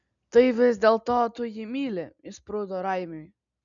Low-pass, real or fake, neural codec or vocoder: 7.2 kHz; real; none